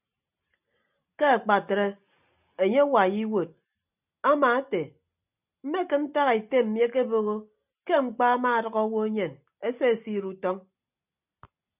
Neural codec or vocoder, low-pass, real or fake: none; 3.6 kHz; real